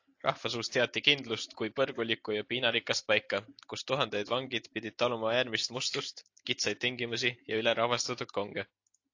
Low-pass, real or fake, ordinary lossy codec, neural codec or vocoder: 7.2 kHz; real; AAC, 48 kbps; none